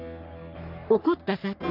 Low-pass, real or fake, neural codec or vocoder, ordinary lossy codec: 5.4 kHz; fake; codec, 44.1 kHz, 3.4 kbps, Pupu-Codec; none